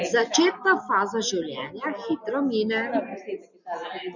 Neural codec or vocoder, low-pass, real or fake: none; 7.2 kHz; real